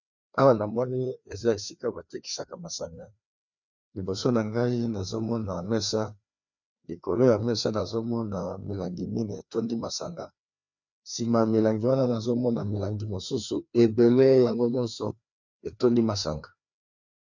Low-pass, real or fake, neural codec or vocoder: 7.2 kHz; fake; codec, 16 kHz, 2 kbps, FreqCodec, larger model